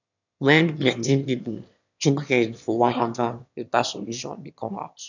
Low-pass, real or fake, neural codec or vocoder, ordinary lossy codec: 7.2 kHz; fake; autoencoder, 22.05 kHz, a latent of 192 numbers a frame, VITS, trained on one speaker; none